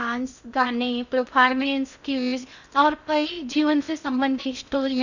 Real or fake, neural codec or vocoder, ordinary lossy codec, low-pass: fake; codec, 16 kHz in and 24 kHz out, 0.6 kbps, FocalCodec, streaming, 2048 codes; none; 7.2 kHz